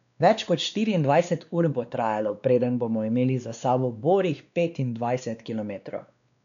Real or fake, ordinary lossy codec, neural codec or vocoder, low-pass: fake; none; codec, 16 kHz, 2 kbps, X-Codec, WavLM features, trained on Multilingual LibriSpeech; 7.2 kHz